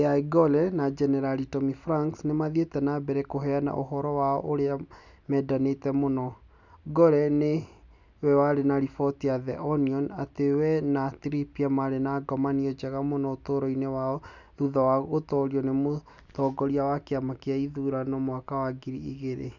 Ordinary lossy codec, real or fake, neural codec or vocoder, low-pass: none; real; none; 7.2 kHz